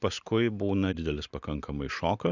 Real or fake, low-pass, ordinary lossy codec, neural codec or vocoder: real; 7.2 kHz; Opus, 64 kbps; none